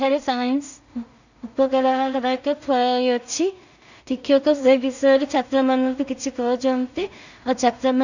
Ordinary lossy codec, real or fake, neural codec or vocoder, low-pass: none; fake; codec, 16 kHz in and 24 kHz out, 0.4 kbps, LongCat-Audio-Codec, two codebook decoder; 7.2 kHz